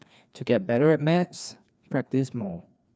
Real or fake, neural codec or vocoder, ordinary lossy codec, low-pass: fake; codec, 16 kHz, 2 kbps, FreqCodec, larger model; none; none